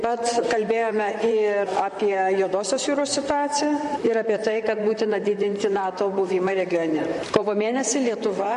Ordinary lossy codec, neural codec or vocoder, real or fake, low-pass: MP3, 48 kbps; vocoder, 44.1 kHz, 128 mel bands, Pupu-Vocoder; fake; 14.4 kHz